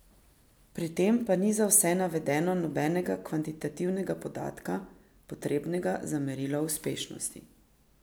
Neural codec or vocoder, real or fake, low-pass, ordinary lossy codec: none; real; none; none